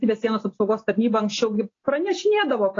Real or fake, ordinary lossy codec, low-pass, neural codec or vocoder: real; AAC, 32 kbps; 7.2 kHz; none